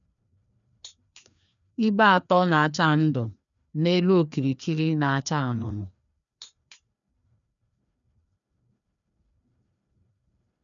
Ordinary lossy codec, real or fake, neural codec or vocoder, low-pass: none; fake; codec, 16 kHz, 2 kbps, FreqCodec, larger model; 7.2 kHz